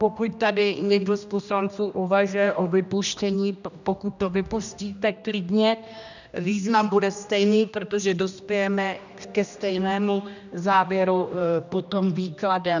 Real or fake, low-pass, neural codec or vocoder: fake; 7.2 kHz; codec, 16 kHz, 1 kbps, X-Codec, HuBERT features, trained on general audio